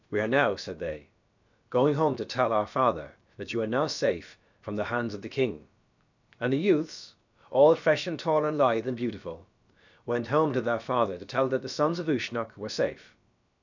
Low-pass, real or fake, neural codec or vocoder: 7.2 kHz; fake; codec, 16 kHz, about 1 kbps, DyCAST, with the encoder's durations